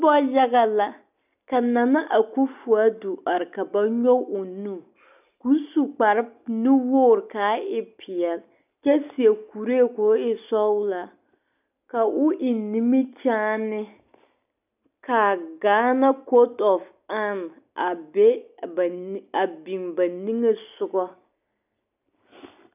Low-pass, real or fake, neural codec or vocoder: 3.6 kHz; real; none